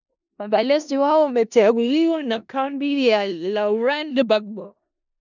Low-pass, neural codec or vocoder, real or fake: 7.2 kHz; codec, 16 kHz in and 24 kHz out, 0.4 kbps, LongCat-Audio-Codec, four codebook decoder; fake